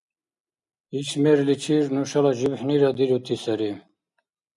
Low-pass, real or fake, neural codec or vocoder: 10.8 kHz; real; none